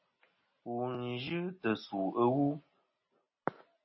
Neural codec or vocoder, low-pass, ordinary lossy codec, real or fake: none; 7.2 kHz; MP3, 24 kbps; real